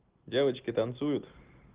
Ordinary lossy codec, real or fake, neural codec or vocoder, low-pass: Opus, 16 kbps; real; none; 3.6 kHz